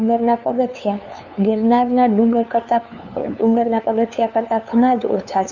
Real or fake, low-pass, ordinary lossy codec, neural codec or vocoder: fake; 7.2 kHz; none; codec, 16 kHz, 2 kbps, FunCodec, trained on LibriTTS, 25 frames a second